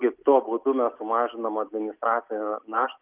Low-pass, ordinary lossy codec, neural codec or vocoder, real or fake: 3.6 kHz; Opus, 24 kbps; none; real